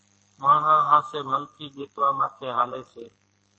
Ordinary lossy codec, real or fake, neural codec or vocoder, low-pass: MP3, 32 kbps; fake; codec, 44.1 kHz, 2.6 kbps, SNAC; 9.9 kHz